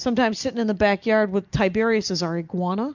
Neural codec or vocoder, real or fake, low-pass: none; real; 7.2 kHz